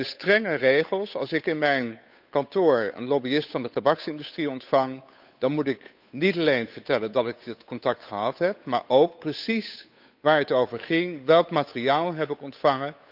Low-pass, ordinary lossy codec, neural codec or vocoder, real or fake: 5.4 kHz; none; codec, 16 kHz, 8 kbps, FunCodec, trained on Chinese and English, 25 frames a second; fake